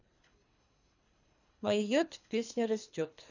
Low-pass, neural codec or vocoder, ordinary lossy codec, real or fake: 7.2 kHz; codec, 24 kHz, 3 kbps, HILCodec; none; fake